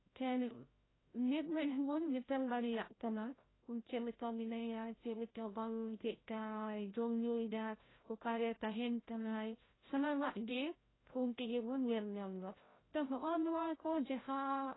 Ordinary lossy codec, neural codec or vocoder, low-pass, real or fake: AAC, 16 kbps; codec, 16 kHz, 0.5 kbps, FreqCodec, larger model; 7.2 kHz; fake